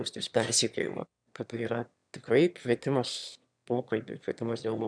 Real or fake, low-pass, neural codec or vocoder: fake; 9.9 kHz; autoencoder, 22.05 kHz, a latent of 192 numbers a frame, VITS, trained on one speaker